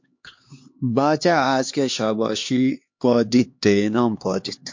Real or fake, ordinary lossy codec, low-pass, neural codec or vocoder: fake; MP3, 48 kbps; 7.2 kHz; codec, 16 kHz, 2 kbps, X-Codec, HuBERT features, trained on LibriSpeech